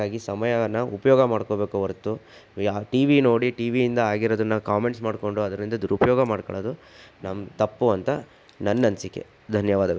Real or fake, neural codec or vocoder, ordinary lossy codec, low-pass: real; none; none; none